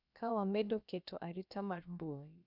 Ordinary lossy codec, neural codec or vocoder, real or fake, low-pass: none; codec, 16 kHz, about 1 kbps, DyCAST, with the encoder's durations; fake; 5.4 kHz